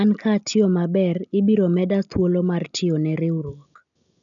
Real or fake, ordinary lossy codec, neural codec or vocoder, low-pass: real; none; none; 7.2 kHz